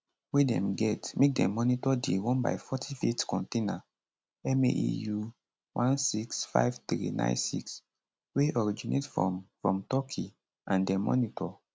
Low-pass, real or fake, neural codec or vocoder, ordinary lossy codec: none; real; none; none